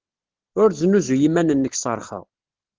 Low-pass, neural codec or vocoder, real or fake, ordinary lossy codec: 7.2 kHz; none; real; Opus, 16 kbps